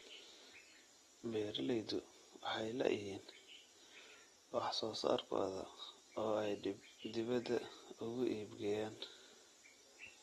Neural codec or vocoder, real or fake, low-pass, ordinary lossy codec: none; real; 19.8 kHz; AAC, 32 kbps